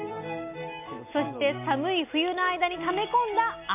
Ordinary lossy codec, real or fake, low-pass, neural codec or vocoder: MP3, 32 kbps; real; 3.6 kHz; none